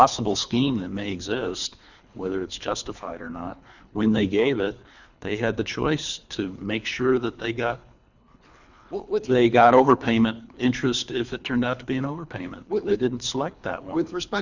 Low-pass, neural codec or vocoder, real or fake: 7.2 kHz; codec, 24 kHz, 3 kbps, HILCodec; fake